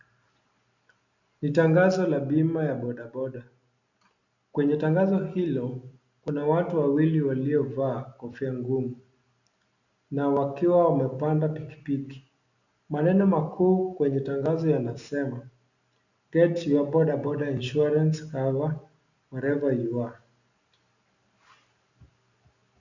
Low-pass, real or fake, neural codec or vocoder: 7.2 kHz; real; none